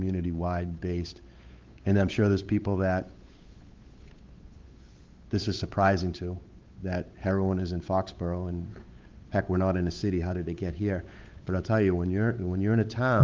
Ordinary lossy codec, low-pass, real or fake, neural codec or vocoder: Opus, 32 kbps; 7.2 kHz; fake; codec, 16 kHz, 8 kbps, FunCodec, trained on Chinese and English, 25 frames a second